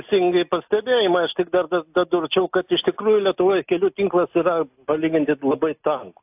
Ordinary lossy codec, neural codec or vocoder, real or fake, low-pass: AAC, 32 kbps; none; real; 3.6 kHz